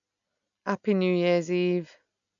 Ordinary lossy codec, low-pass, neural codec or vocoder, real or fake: none; 7.2 kHz; none; real